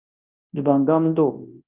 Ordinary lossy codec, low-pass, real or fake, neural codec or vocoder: Opus, 32 kbps; 3.6 kHz; fake; codec, 24 kHz, 0.9 kbps, WavTokenizer, large speech release